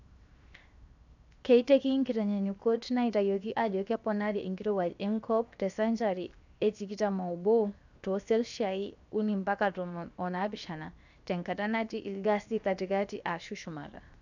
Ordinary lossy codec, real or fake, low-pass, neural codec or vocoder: none; fake; 7.2 kHz; codec, 16 kHz, 0.7 kbps, FocalCodec